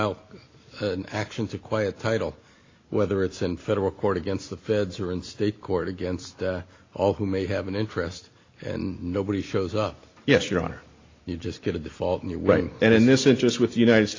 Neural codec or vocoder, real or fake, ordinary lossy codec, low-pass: none; real; AAC, 32 kbps; 7.2 kHz